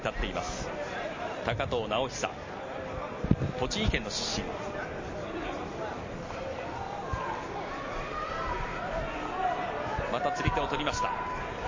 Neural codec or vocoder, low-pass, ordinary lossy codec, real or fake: none; 7.2 kHz; MP3, 32 kbps; real